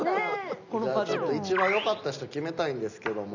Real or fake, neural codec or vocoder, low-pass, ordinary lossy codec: real; none; 7.2 kHz; none